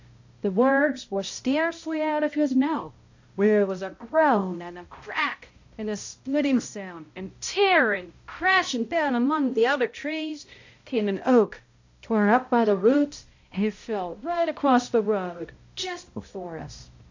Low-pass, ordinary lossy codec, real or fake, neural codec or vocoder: 7.2 kHz; AAC, 48 kbps; fake; codec, 16 kHz, 0.5 kbps, X-Codec, HuBERT features, trained on balanced general audio